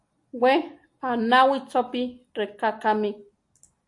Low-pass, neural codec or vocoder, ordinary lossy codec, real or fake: 10.8 kHz; none; AAC, 64 kbps; real